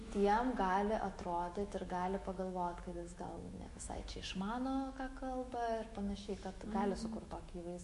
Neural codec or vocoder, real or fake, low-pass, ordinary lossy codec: autoencoder, 48 kHz, 128 numbers a frame, DAC-VAE, trained on Japanese speech; fake; 14.4 kHz; MP3, 48 kbps